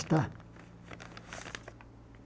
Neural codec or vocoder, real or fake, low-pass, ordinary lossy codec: none; real; none; none